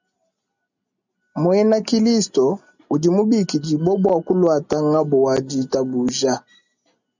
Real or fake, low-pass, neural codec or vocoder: real; 7.2 kHz; none